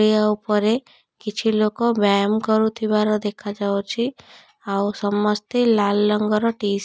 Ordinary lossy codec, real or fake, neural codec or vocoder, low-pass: none; real; none; none